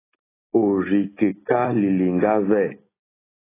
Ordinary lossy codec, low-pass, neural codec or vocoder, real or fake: AAC, 16 kbps; 3.6 kHz; none; real